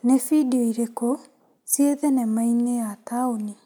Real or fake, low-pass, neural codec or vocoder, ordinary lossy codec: real; none; none; none